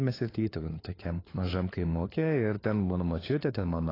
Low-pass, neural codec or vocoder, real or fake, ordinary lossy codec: 5.4 kHz; codec, 16 kHz, 4 kbps, FunCodec, trained on LibriTTS, 50 frames a second; fake; AAC, 24 kbps